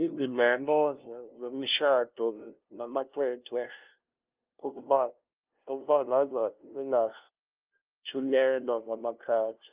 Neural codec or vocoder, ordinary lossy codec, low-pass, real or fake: codec, 16 kHz, 0.5 kbps, FunCodec, trained on LibriTTS, 25 frames a second; Opus, 24 kbps; 3.6 kHz; fake